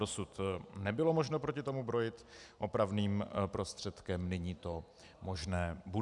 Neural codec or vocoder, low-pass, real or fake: none; 10.8 kHz; real